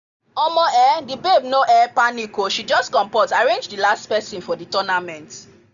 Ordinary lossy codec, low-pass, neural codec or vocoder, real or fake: none; 7.2 kHz; none; real